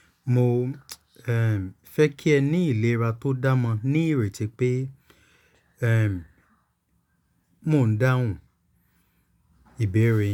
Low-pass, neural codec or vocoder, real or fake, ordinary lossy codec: 19.8 kHz; none; real; none